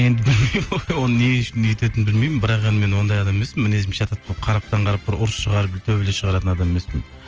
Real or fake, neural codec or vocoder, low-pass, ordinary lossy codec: real; none; 7.2 kHz; Opus, 24 kbps